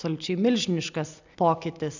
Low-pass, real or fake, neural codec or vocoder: 7.2 kHz; real; none